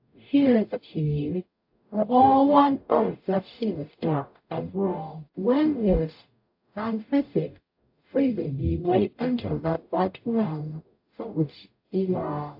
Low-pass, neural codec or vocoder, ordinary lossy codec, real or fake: 5.4 kHz; codec, 44.1 kHz, 0.9 kbps, DAC; AAC, 48 kbps; fake